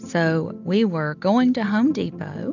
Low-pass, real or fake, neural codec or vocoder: 7.2 kHz; real; none